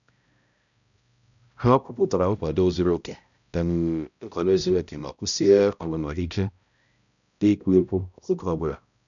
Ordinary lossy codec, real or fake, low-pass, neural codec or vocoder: none; fake; 7.2 kHz; codec, 16 kHz, 0.5 kbps, X-Codec, HuBERT features, trained on balanced general audio